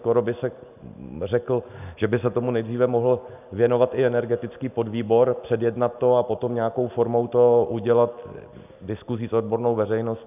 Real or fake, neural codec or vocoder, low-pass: real; none; 3.6 kHz